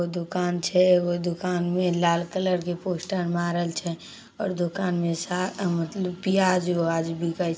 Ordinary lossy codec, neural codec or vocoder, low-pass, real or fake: none; none; none; real